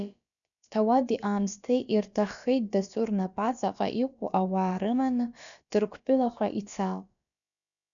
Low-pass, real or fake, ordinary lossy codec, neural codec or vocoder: 7.2 kHz; fake; MP3, 96 kbps; codec, 16 kHz, about 1 kbps, DyCAST, with the encoder's durations